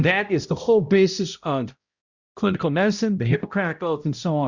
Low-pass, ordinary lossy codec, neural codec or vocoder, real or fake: 7.2 kHz; Opus, 64 kbps; codec, 16 kHz, 0.5 kbps, X-Codec, HuBERT features, trained on balanced general audio; fake